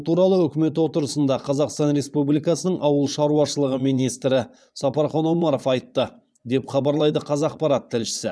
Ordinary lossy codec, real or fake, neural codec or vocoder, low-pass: none; fake; vocoder, 22.05 kHz, 80 mel bands, Vocos; none